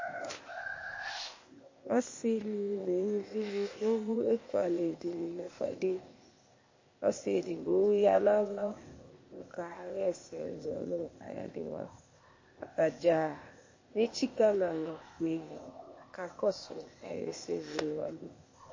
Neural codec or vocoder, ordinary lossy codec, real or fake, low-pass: codec, 16 kHz, 0.8 kbps, ZipCodec; MP3, 32 kbps; fake; 7.2 kHz